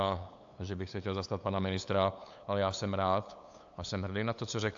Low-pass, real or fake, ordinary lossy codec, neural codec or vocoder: 7.2 kHz; fake; AAC, 64 kbps; codec, 16 kHz, 8 kbps, FunCodec, trained on LibriTTS, 25 frames a second